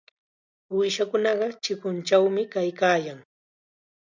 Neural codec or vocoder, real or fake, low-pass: none; real; 7.2 kHz